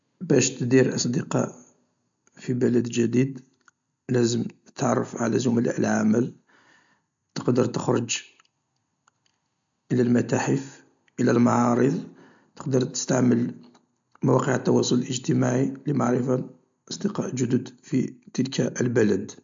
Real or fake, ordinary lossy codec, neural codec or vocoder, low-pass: real; MP3, 64 kbps; none; 7.2 kHz